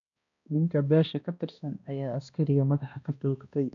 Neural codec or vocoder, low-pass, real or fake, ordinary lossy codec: codec, 16 kHz, 1 kbps, X-Codec, HuBERT features, trained on balanced general audio; 7.2 kHz; fake; none